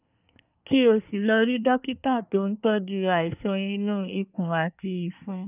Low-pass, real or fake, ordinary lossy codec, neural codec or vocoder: 3.6 kHz; fake; none; codec, 24 kHz, 1 kbps, SNAC